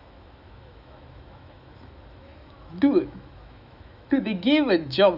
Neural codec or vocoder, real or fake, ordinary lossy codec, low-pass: autoencoder, 48 kHz, 128 numbers a frame, DAC-VAE, trained on Japanese speech; fake; none; 5.4 kHz